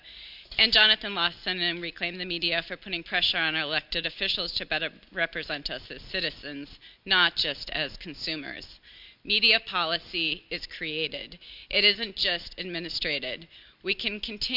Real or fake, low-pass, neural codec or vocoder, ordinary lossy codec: real; 5.4 kHz; none; MP3, 48 kbps